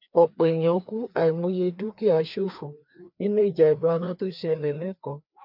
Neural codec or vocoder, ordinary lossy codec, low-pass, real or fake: codec, 16 kHz, 2 kbps, FreqCodec, larger model; none; 5.4 kHz; fake